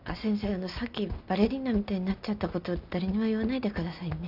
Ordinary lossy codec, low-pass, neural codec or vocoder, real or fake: none; 5.4 kHz; none; real